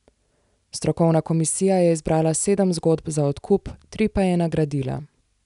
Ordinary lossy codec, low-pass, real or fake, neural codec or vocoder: none; 10.8 kHz; real; none